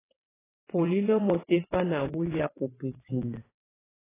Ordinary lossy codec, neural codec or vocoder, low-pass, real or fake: AAC, 16 kbps; vocoder, 22.05 kHz, 80 mel bands, WaveNeXt; 3.6 kHz; fake